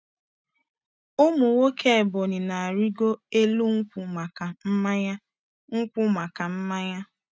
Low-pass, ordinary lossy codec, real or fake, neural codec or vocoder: none; none; real; none